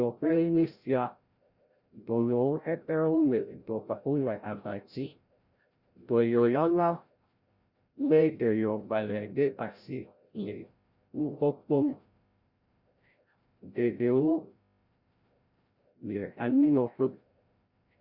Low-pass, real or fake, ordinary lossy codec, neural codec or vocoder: 5.4 kHz; fake; Opus, 64 kbps; codec, 16 kHz, 0.5 kbps, FreqCodec, larger model